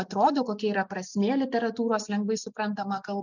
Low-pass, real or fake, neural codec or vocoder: 7.2 kHz; real; none